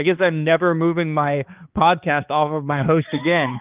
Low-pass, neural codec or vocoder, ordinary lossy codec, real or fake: 3.6 kHz; codec, 16 kHz, 2 kbps, X-Codec, HuBERT features, trained on balanced general audio; Opus, 32 kbps; fake